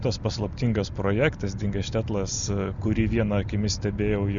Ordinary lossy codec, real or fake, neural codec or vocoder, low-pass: Opus, 64 kbps; real; none; 7.2 kHz